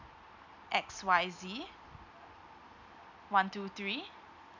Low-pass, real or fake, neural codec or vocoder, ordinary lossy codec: 7.2 kHz; real; none; none